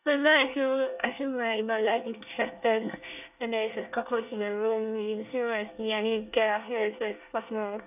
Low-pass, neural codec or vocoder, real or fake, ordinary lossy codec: 3.6 kHz; codec, 24 kHz, 1 kbps, SNAC; fake; none